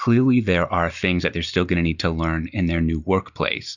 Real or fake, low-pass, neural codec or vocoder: real; 7.2 kHz; none